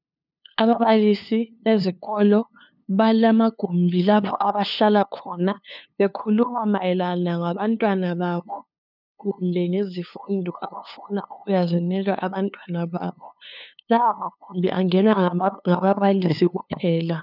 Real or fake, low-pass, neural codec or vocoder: fake; 5.4 kHz; codec, 16 kHz, 2 kbps, FunCodec, trained on LibriTTS, 25 frames a second